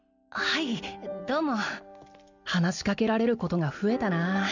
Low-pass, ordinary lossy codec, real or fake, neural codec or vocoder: 7.2 kHz; none; real; none